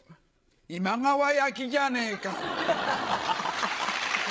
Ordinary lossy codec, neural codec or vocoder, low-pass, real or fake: none; codec, 16 kHz, 16 kbps, FreqCodec, larger model; none; fake